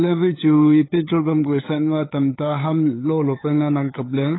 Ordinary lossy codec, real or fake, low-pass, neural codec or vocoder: AAC, 16 kbps; fake; 7.2 kHz; codec, 16 kHz, 8 kbps, FunCodec, trained on LibriTTS, 25 frames a second